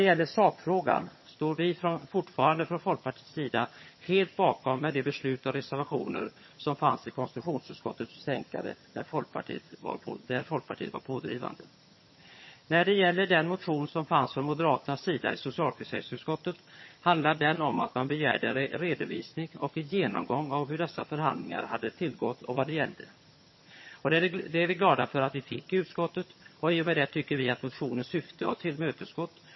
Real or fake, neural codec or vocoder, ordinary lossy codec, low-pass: fake; vocoder, 22.05 kHz, 80 mel bands, HiFi-GAN; MP3, 24 kbps; 7.2 kHz